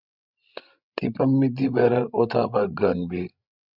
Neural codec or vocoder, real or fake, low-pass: codec, 16 kHz, 16 kbps, FreqCodec, larger model; fake; 5.4 kHz